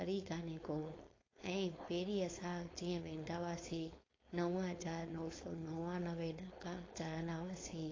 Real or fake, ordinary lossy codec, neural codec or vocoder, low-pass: fake; AAC, 32 kbps; codec, 16 kHz, 4.8 kbps, FACodec; 7.2 kHz